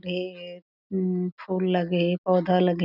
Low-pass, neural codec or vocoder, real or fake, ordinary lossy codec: 5.4 kHz; none; real; none